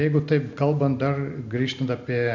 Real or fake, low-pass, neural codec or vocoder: real; 7.2 kHz; none